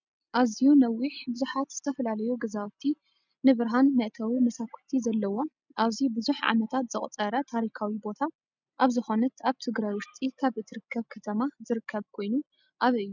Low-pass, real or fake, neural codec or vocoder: 7.2 kHz; real; none